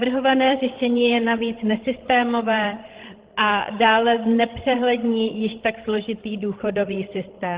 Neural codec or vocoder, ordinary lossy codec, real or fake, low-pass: codec, 16 kHz, 16 kbps, FreqCodec, larger model; Opus, 16 kbps; fake; 3.6 kHz